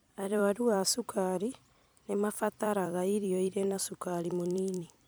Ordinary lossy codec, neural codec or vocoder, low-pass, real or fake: none; vocoder, 44.1 kHz, 128 mel bands every 256 samples, BigVGAN v2; none; fake